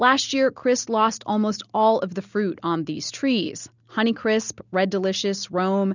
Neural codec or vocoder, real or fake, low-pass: none; real; 7.2 kHz